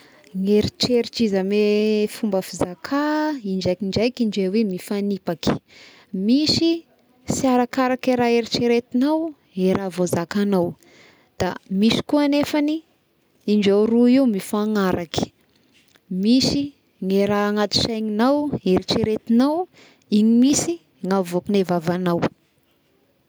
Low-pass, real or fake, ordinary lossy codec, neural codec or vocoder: none; real; none; none